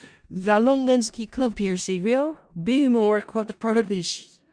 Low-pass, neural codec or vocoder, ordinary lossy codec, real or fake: 9.9 kHz; codec, 16 kHz in and 24 kHz out, 0.4 kbps, LongCat-Audio-Codec, four codebook decoder; Opus, 64 kbps; fake